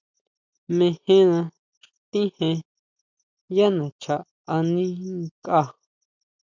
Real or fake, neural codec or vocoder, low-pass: real; none; 7.2 kHz